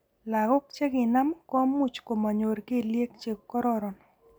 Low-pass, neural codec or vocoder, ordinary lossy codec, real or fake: none; none; none; real